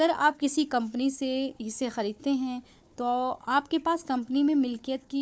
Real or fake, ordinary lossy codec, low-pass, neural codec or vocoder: fake; none; none; codec, 16 kHz, 4 kbps, FunCodec, trained on Chinese and English, 50 frames a second